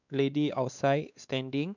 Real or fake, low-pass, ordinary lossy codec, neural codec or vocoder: fake; 7.2 kHz; none; codec, 16 kHz, 2 kbps, X-Codec, WavLM features, trained on Multilingual LibriSpeech